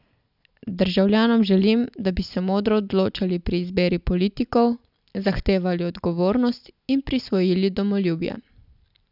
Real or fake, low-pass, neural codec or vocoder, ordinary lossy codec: real; 5.4 kHz; none; none